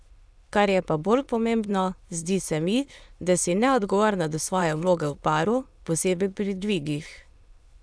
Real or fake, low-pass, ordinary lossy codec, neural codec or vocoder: fake; none; none; autoencoder, 22.05 kHz, a latent of 192 numbers a frame, VITS, trained on many speakers